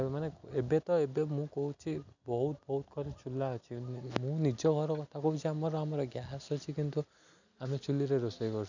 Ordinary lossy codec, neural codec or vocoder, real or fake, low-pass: none; none; real; 7.2 kHz